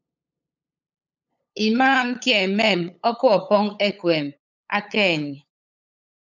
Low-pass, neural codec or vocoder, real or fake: 7.2 kHz; codec, 16 kHz, 8 kbps, FunCodec, trained on LibriTTS, 25 frames a second; fake